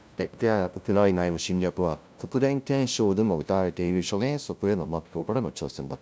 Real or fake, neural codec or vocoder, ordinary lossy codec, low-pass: fake; codec, 16 kHz, 0.5 kbps, FunCodec, trained on LibriTTS, 25 frames a second; none; none